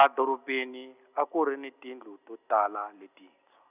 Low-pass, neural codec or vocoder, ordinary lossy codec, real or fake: 3.6 kHz; none; none; real